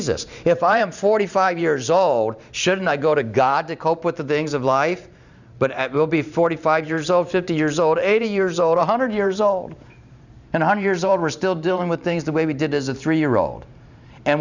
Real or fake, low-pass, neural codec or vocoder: fake; 7.2 kHz; vocoder, 44.1 kHz, 128 mel bands every 512 samples, BigVGAN v2